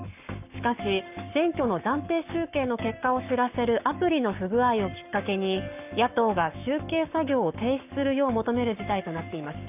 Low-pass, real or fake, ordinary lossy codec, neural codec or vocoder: 3.6 kHz; fake; none; codec, 44.1 kHz, 7.8 kbps, Pupu-Codec